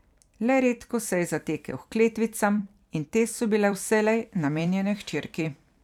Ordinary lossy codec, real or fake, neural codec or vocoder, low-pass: none; fake; vocoder, 44.1 kHz, 128 mel bands every 256 samples, BigVGAN v2; 19.8 kHz